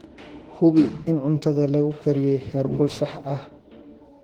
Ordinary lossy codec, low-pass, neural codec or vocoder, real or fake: Opus, 16 kbps; 19.8 kHz; autoencoder, 48 kHz, 32 numbers a frame, DAC-VAE, trained on Japanese speech; fake